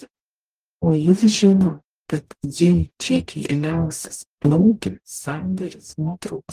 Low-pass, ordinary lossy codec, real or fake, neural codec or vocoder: 14.4 kHz; Opus, 24 kbps; fake; codec, 44.1 kHz, 0.9 kbps, DAC